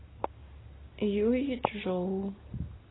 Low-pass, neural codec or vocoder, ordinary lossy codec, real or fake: 7.2 kHz; none; AAC, 16 kbps; real